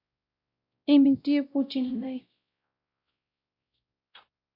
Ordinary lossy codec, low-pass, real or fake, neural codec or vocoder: AAC, 48 kbps; 5.4 kHz; fake; codec, 16 kHz, 0.5 kbps, X-Codec, WavLM features, trained on Multilingual LibriSpeech